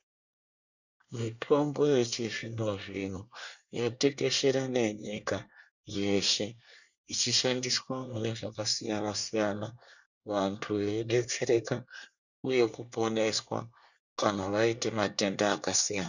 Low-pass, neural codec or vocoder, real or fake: 7.2 kHz; codec, 24 kHz, 1 kbps, SNAC; fake